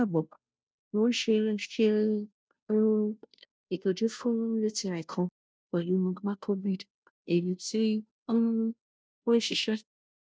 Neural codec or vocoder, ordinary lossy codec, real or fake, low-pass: codec, 16 kHz, 0.5 kbps, FunCodec, trained on Chinese and English, 25 frames a second; none; fake; none